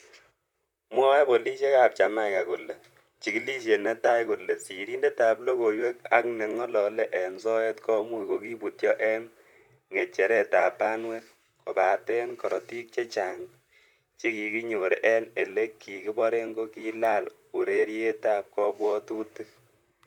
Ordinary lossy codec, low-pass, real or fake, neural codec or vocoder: none; 19.8 kHz; fake; vocoder, 44.1 kHz, 128 mel bands, Pupu-Vocoder